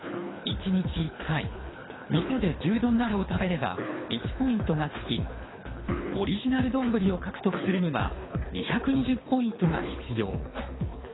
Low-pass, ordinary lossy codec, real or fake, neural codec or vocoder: 7.2 kHz; AAC, 16 kbps; fake; codec, 24 kHz, 3 kbps, HILCodec